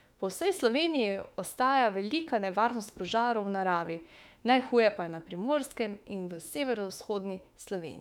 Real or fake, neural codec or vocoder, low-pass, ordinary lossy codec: fake; autoencoder, 48 kHz, 32 numbers a frame, DAC-VAE, trained on Japanese speech; 19.8 kHz; none